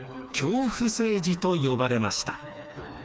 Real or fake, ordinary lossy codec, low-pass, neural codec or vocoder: fake; none; none; codec, 16 kHz, 2 kbps, FreqCodec, smaller model